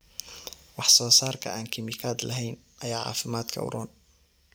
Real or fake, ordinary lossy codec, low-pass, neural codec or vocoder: fake; none; none; vocoder, 44.1 kHz, 128 mel bands every 256 samples, BigVGAN v2